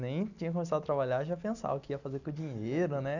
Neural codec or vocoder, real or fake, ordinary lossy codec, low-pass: none; real; none; 7.2 kHz